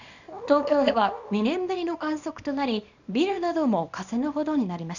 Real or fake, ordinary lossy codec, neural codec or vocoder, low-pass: fake; none; codec, 24 kHz, 0.9 kbps, WavTokenizer, small release; 7.2 kHz